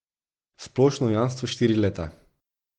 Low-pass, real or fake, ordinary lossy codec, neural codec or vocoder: 19.8 kHz; real; Opus, 16 kbps; none